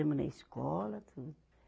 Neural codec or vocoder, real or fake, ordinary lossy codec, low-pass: none; real; none; none